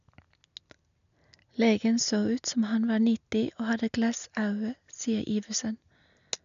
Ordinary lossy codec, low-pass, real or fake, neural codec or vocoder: none; 7.2 kHz; real; none